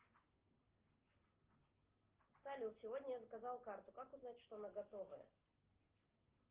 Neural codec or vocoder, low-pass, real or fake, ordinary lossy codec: none; 3.6 kHz; real; Opus, 16 kbps